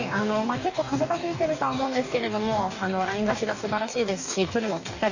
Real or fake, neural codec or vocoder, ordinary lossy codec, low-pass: fake; codec, 44.1 kHz, 2.6 kbps, DAC; none; 7.2 kHz